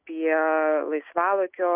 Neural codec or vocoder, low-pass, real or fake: none; 3.6 kHz; real